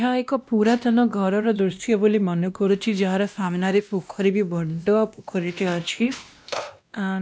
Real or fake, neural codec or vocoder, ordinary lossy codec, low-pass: fake; codec, 16 kHz, 1 kbps, X-Codec, WavLM features, trained on Multilingual LibriSpeech; none; none